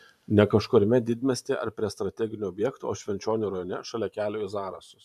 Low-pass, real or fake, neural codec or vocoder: 14.4 kHz; fake; vocoder, 44.1 kHz, 128 mel bands every 512 samples, BigVGAN v2